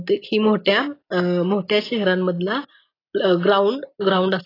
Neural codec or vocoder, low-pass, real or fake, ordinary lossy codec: none; 5.4 kHz; real; AAC, 24 kbps